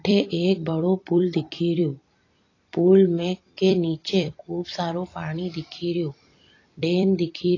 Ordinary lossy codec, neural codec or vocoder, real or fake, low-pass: AAC, 32 kbps; vocoder, 44.1 kHz, 128 mel bands every 256 samples, BigVGAN v2; fake; 7.2 kHz